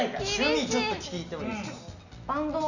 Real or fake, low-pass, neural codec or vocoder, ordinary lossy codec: real; 7.2 kHz; none; none